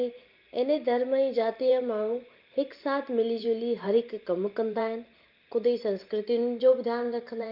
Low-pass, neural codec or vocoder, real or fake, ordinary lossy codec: 5.4 kHz; vocoder, 44.1 kHz, 128 mel bands every 512 samples, BigVGAN v2; fake; Opus, 24 kbps